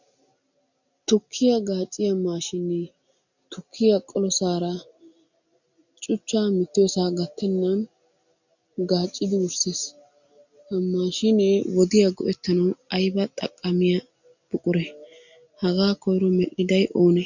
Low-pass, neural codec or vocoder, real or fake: 7.2 kHz; none; real